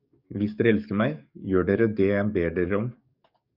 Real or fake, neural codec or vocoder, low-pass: fake; codec, 44.1 kHz, 7.8 kbps, DAC; 5.4 kHz